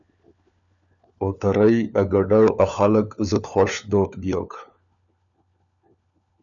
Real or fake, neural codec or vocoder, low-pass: fake; codec, 16 kHz, 4 kbps, FunCodec, trained on LibriTTS, 50 frames a second; 7.2 kHz